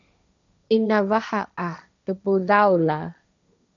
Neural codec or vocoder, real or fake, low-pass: codec, 16 kHz, 1.1 kbps, Voila-Tokenizer; fake; 7.2 kHz